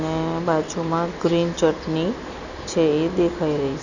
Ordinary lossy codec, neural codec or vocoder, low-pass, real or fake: none; none; 7.2 kHz; real